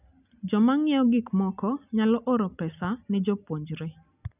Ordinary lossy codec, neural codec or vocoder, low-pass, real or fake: none; none; 3.6 kHz; real